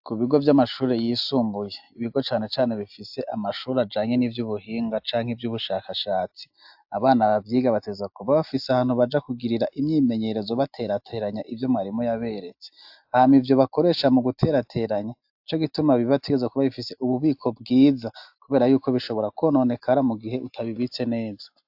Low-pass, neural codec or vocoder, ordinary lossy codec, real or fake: 5.4 kHz; none; AAC, 48 kbps; real